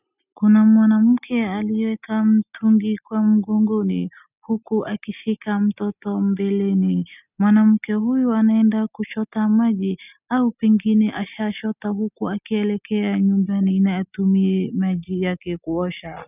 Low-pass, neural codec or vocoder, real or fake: 3.6 kHz; none; real